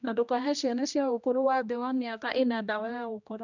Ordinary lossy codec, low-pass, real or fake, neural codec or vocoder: none; 7.2 kHz; fake; codec, 16 kHz, 1 kbps, X-Codec, HuBERT features, trained on general audio